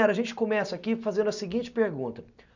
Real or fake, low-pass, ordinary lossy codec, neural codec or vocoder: real; 7.2 kHz; none; none